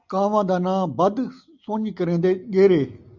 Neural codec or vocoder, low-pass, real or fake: none; 7.2 kHz; real